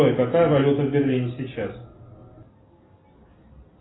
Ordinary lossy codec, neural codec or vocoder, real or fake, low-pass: AAC, 16 kbps; none; real; 7.2 kHz